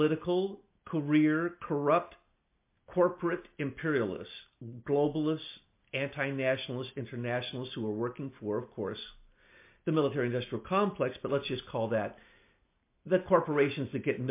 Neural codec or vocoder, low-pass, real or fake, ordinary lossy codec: none; 3.6 kHz; real; MP3, 24 kbps